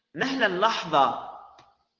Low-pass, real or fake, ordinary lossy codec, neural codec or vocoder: 7.2 kHz; real; Opus, 16 kbps; none